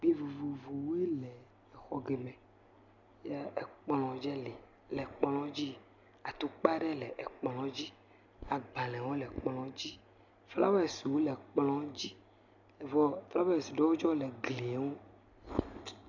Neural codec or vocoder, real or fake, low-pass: none; real; 7.2 kHz